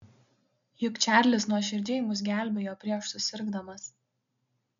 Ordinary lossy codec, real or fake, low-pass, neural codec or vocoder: MP3, 96 kbps; real; 7.2 kHz; none